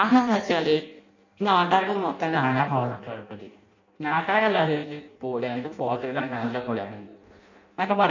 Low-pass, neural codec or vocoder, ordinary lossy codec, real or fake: 7.2 kHz; codec, 16 kHz in and 24 kHz out, 0.6 kbps, FireRedTTS-2 codec; none; fake